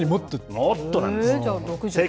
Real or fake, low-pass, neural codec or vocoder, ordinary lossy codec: real; none; none; none